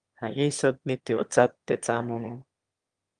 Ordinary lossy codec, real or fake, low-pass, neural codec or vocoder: Opus, 32 kbps; fake; 9.9 kHz; autoencoder, 22.05 kHz, a latent of 192 numbers a frame, VITS, trained on one speaker